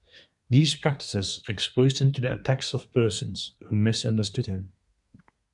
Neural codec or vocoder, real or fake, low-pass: codec, 24 kHz, 1 kbps, SNAC; fake; 10.8 kHz